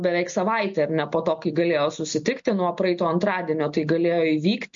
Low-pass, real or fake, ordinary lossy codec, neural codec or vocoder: 7.2 kHz; real; MP3, 48 kbps; none